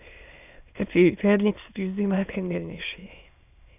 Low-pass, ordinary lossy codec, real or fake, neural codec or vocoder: 3.6 kHz; none; fake; autoencoder, 22.05 kHz, a latent of 192 numbers a frame, VITS, trained on many speakers